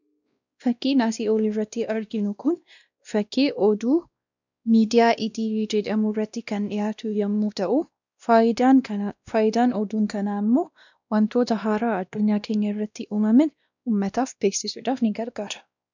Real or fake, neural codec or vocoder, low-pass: fake; codec, 16 kHz, 1 kbps, X-Codec, WavLM features, trained on Multilingual LibriSpeech; 7.2 kHz